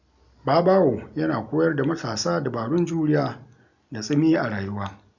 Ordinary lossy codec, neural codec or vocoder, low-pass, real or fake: none; none; 7.2 kHz; real